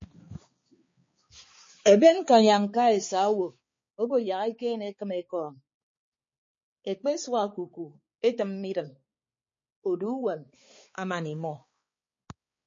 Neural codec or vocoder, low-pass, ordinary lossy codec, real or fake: codec, 16 kHz, 4 kbps, X-Codec, HuBERT features, trained on balanced general audio; 7.2 kHz; MP3, 32 kbps; fake